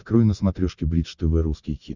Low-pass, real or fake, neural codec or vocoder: 7.2 kHz; real; none